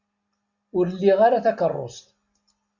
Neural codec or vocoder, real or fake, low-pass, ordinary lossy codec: none; real; 7.2 kHz; Opus, 64 kbps